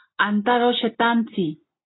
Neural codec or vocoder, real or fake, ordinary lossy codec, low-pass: none; real; AAC, 16 kbps; 7.2 kHz